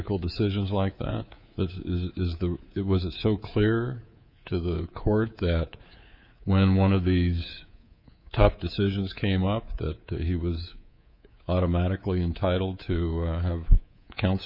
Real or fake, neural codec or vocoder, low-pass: fake; codec, 24 kHz, 3.1 kbps, DualCodec; 5.4 kHz